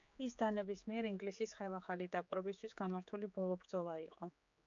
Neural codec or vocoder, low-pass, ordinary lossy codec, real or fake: codec, 16 kHz, 4 kbps, X-Codec, HuBERT features, trained on general audio; 7.2 kHz; MP3, 64 kbps; fake